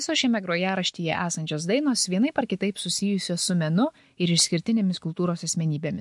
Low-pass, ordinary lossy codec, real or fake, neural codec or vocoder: 10.8 kHz; MP3, 64 kbps; real; none